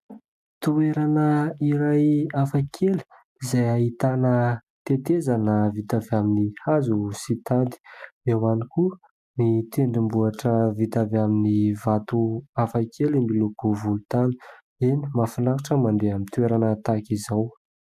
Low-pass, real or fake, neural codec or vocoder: 14.4 kHz; real; none